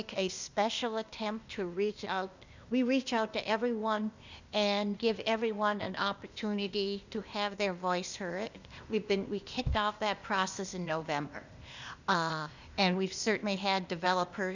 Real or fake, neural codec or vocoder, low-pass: fake; codec, 16 kHz, 0.8 kbps, ZipCodec; 7.2 kHz